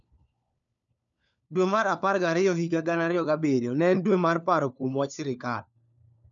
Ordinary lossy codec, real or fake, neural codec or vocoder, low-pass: none; fake; codec, 16 kHz, 4 kbps, FunCodec, trained on LibriTTS, 50 frames a second; 7.2 kHz